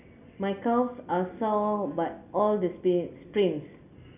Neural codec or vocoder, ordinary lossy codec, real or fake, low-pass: none; none; real; 3.6 kHz